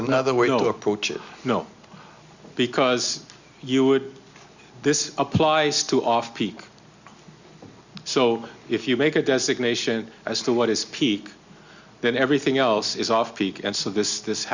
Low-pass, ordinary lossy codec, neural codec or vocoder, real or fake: 7.2 kHz; Opus, 64 kbps; none; real